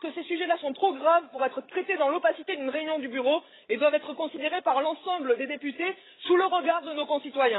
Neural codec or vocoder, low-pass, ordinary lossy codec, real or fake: codec, 16 kHz, 8 kbps, FreqCodec, larger model; 7.2 kHz; AAC, 16 kbps; fake